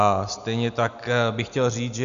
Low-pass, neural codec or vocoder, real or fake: 7.2 kHz; none; real